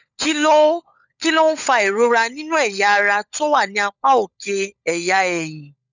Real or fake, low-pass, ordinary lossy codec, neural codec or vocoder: fake; 7.2 kHz; none; codec, 16 kHz, 16 kbps, FunCodec, trained on LibriTTS, 50 frames a second